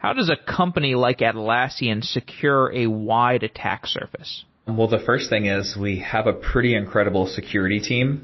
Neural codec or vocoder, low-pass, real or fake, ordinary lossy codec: none; 7.2 kHz; real; MP3, 24 kbps